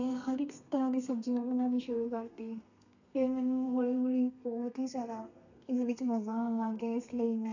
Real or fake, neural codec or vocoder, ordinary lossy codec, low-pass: fake; codec, 32 kHz, 1.9 kbps, SNAC; none; 7.2 kHz